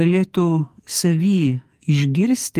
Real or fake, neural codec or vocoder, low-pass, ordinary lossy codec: fake; codec, 44.1 kHz, 2.6 kbps, SNAC; 14.4 kHz; Opus, 32 kbps